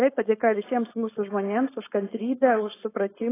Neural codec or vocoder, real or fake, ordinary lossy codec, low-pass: codec, 16 kHz, 4.8 kbps, FACodec; fake; AAC, 16 kbps; 3.6 kHz